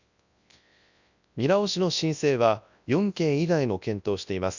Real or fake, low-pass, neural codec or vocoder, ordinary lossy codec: fake; 7.2 kHz; codec, 24 kHz, 0.9 kbps, WavTokenizer, large speech release; none